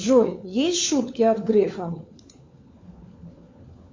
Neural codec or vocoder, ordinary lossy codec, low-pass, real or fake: codec, 16 kHz, 4 kbps, FunCodec, trained on LibriTTS, 50 frames a second; MP3, 48 kbps; 7.2 kHz; fake